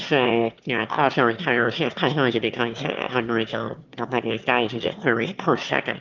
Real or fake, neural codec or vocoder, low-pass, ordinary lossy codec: fake; autoencoder, 22.05 kHz, a latent of 192 numbers a frame, VITS, trained on one speaker; 7.2 kHz; Opus, 32 kbps